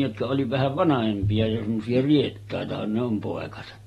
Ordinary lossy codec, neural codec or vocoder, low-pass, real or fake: AAC, 32 kbps; none; 19.8 kHz; real